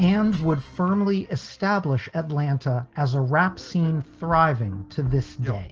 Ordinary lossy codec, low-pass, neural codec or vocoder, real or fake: Opus, 16 kbps; 7.2 kHz; none; real